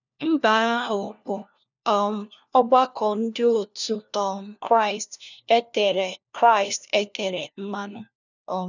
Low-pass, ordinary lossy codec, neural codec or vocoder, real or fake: 7.2 kHz; none; codec, 16 kHz, 1 kbps, FunCodec, trained on LibriTTS, 50 frames a second; fake